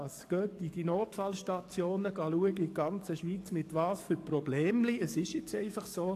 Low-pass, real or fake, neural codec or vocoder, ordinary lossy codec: 14.4 kHz; fake; codec, 44.1 kHz, 7.8 kbps, DAC; AAC, 64 kbps